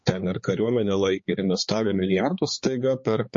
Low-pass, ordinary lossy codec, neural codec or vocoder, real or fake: 7.2 kHz; MP3, 32 kbps; codec, 16 kHz, 4 kbps, X-Codec, HuBERT features, trained on balanced general audio; fake